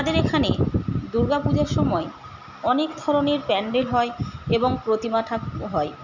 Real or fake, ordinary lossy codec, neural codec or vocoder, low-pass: real; none; none; 7.2 kHz